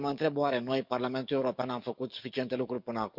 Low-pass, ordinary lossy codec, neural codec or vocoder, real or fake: 5.4 kHz; none; codec, 44.1 kHz, 7.8 kbps, DAC; fake